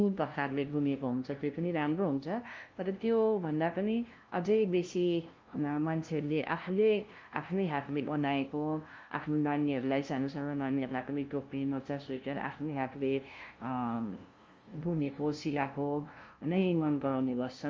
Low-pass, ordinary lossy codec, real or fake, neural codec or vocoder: 7.2 kHz; Opus, 32 kbps; fake; codec, 16 kHz, 0.5 kbps, FunCodec, trained on LibriTTS, 25 frames a second